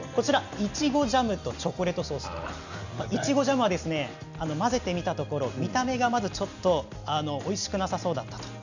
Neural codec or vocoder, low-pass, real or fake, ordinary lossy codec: none; 7.2 kHz; real; none